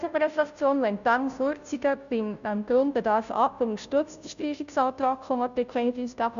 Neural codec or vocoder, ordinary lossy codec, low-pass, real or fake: codec, 16 kHz, 0.5 kbps, FunCodec, trained on Chinese and English, 25 frames a second; none; 7.2 kHz; fake